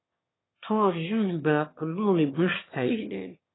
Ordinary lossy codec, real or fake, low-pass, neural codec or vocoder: AAC, 16 kbps; fake; 7.2 kHz; autoencoder, 22.05 kHz, a latent of 192 numbers a frame, VITS, trained on one speaker